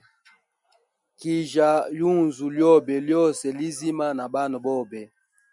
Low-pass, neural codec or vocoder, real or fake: 10.8 kHz; none; real